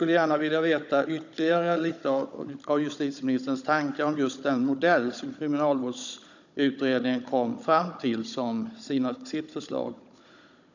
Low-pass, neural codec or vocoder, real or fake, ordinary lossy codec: 7.2 kHz; codec, 16 kHz, 16 kbps, FunCodec, trained on Chinese and English, 50 frames a second; fake; none